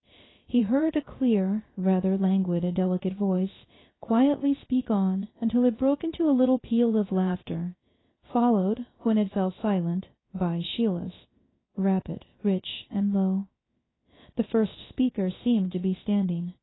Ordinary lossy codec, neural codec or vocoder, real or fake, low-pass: AAC, 16 kbps; codec, 16 kHz, 0.3 kbps, FocalCodec; fake; 7.2 kHz